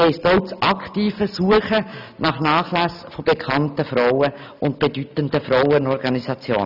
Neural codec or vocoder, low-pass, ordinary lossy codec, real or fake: none; 5.4 kHz; none; real